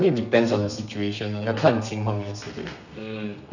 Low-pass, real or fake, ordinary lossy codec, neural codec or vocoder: 7.2 kHz; fake; none; codec, 32 kHz, 1.9 kbps, SNAC